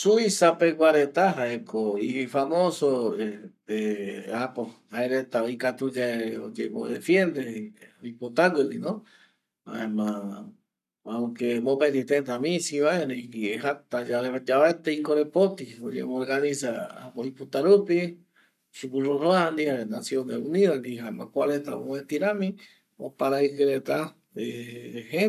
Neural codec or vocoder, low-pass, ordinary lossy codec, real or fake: codec, 44.1 kHz, 7.8 kbps, Pupu-Codec; 14.4 kHz; none; fake